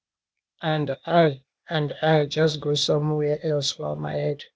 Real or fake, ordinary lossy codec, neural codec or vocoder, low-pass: fake; none; codec, 16 kHz, 0.8 kbps, ZipCodec; none